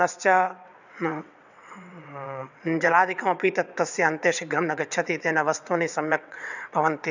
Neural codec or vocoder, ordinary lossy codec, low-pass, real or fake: vocoder, 22.05 kHz, 80 mel bands, WaveNeXt; none; 7.2 kHz; fake